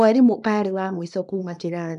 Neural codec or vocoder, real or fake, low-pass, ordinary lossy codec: codec, 24 kHz, 0.9 kbps, WavTokenizer, small release; fake; 10.8 kHz; none